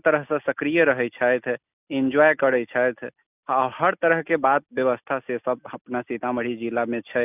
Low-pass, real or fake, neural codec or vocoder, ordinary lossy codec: 3.6 kHz; real; none; none